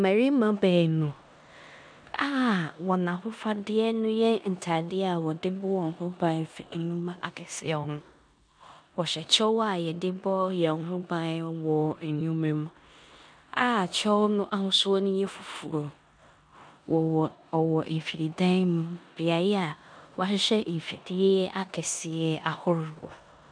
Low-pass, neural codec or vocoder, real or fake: 9.9 kHz; codec, 16 kHz in and 24 kHz out, 0.9 kbps, LongCat-Audio-Codec, four codebook decoder; fake